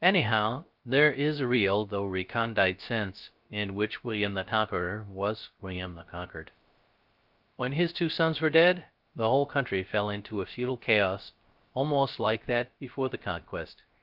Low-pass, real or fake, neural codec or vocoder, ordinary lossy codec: 5.4 kHz; fake; codec, 16 kHz, 0.3 kbps, FocalCodec; Opus, 24 kbps